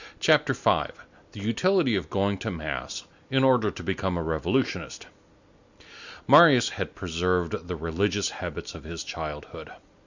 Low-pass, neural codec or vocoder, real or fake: 7.2 kHz; none; real